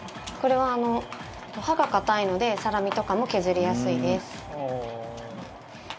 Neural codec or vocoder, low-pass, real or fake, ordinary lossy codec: none; none; real; none